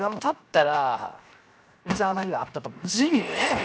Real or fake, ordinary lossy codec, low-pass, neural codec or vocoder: fake; none; none; codec, 16 kHz, 0.7 kbps, FocalCodec